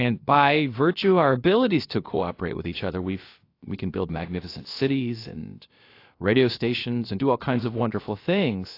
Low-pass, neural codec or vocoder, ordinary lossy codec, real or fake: 5.4 kHz; codec, 16 kHz, about 1 kbps, DyCAST, with the encoder's durations; AAC, 32 kbps; fake